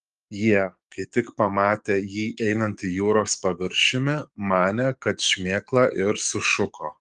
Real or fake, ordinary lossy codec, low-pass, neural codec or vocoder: fake; Opus, 32 kbps; 10.8 kHz; codec, 44.1 kHz, 7.8 kbps, DAC